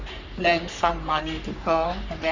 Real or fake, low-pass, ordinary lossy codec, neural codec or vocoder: fake; 7.2 kHz; none; codec, 44.1 kHz, 3.4 kbps, Pupu-Codec